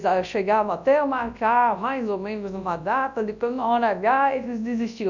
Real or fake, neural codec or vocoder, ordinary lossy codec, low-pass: fake; codec, 24 kHz, 0.9 kbps, WavTokenizer, large speech release; none; 7.2 kHz